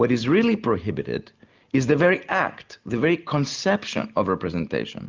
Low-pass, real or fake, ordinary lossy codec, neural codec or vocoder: 7.2 kHz; real; Opus, 32 kbps; none